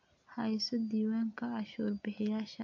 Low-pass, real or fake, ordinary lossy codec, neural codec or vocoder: 7.2 kHz; real; AAC, 48 kbps; none